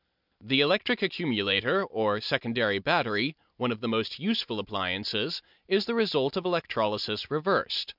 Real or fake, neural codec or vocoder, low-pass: real; none; 5.4 kHz